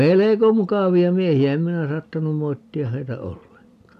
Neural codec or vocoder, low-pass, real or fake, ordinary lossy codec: none; 14.4 kHz; real; none